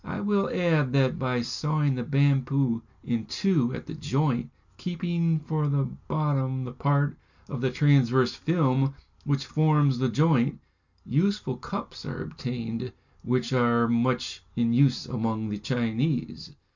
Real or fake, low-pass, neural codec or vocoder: real; 7.2 kHz; none